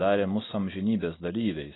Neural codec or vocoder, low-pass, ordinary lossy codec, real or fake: none; 7.2 kHz; AAC, 16 kbps; real